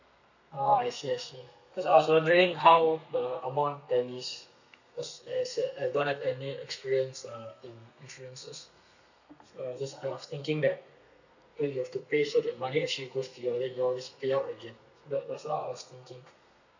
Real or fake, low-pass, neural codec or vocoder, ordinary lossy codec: fake; 7.2 kHz; codec, 32 kHz, 1.9 kbps, SNAC; none